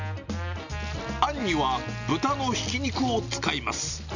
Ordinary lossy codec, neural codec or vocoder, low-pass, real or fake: none; none; 7.2 kHz; real